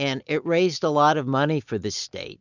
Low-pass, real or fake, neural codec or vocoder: 7.2 kHz; real; none